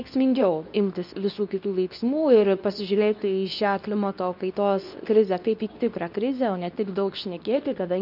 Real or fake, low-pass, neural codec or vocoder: fake; 5.4 kHz; codec, 24 kHz, 0.9 kbps, WavTokenizer, medium speech release version 2